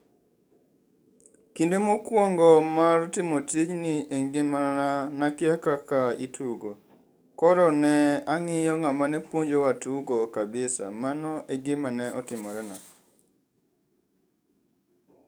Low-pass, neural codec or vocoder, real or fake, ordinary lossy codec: none; codec, 44.1 kHz, 7.8 kbps, DAC; fake; none